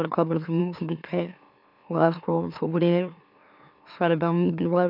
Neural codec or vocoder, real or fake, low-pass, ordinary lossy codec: autoencoder, 44.1 kHz, a latent of 192 numbers a frame, MeloTTS; fake; 5.4 kHz; none